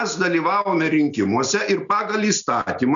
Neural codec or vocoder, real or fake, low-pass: none; real; 7.2 kHz